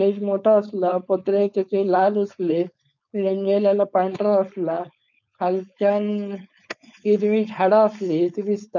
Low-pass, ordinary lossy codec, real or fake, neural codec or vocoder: 7.2 kHz; none; fake; codec, 16 kHz, 4.8 kbps, FACodec